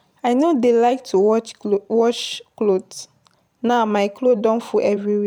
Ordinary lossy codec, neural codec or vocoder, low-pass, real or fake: none; vocoder, 44.1 kHz, 128 mel bands every 512 samples, BigVGAN v2; 19.8 kHz; fake